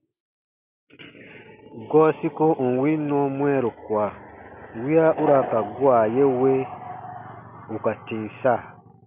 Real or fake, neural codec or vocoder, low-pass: real; none; 3.6 kHz